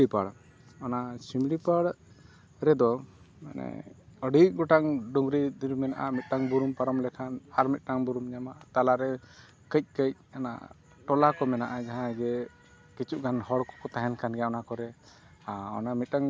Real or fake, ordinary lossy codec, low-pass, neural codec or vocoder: real; none; none; none